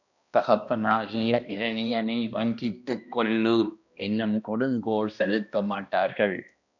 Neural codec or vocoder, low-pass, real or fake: codec, 16 kHz, 1 kbps, X-Codec, HuBERT features, trained on balanced general audio; 7.2 kHz; fake